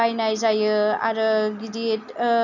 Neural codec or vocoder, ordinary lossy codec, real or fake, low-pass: none; none; real; 7.2 kHz